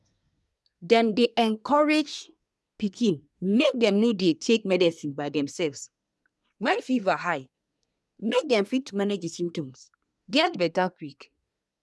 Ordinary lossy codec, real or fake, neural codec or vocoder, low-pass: none; fake; codec, 24 kHz, 1 kbps, SNAC; none